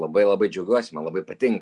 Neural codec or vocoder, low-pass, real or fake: none; 10.8 kHz; real